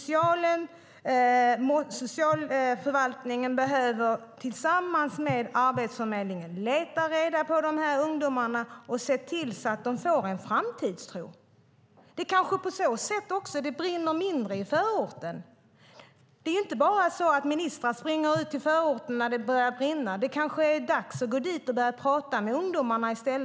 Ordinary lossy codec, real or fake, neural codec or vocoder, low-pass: none; real; none; none